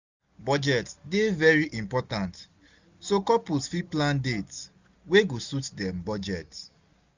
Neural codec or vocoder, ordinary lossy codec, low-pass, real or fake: none; Opus, 64 kbps; 7.2 kHz; real